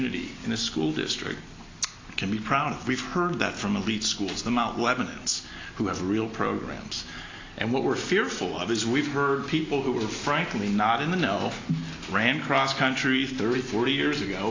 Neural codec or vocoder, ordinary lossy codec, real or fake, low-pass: none; AAC, 32 kbps; real; 7.2 kHz